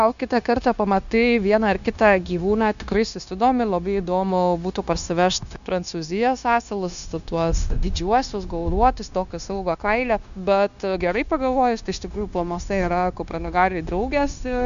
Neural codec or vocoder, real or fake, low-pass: codec, 16 kHz, 0.9 kbps, LongCat-Audio-Codec; fake; 7.2 kHz